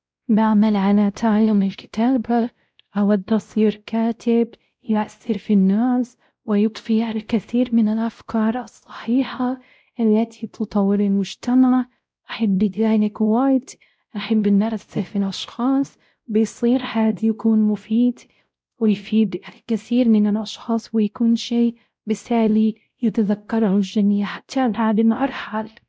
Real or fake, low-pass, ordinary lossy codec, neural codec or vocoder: fake; none; none; codec, 16 kHz, 0.5 kbps, X-Codec, WavLM features, trained on Multilingual LibriSpeech